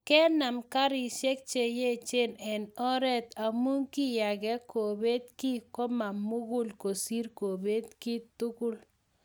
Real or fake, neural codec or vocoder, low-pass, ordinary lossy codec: real; none; none; none